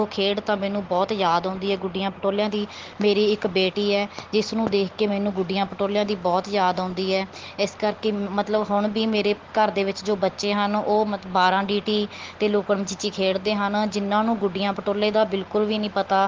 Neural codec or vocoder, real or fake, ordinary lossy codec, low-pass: none; real; Opus, 16 kbps; 7.2 kHz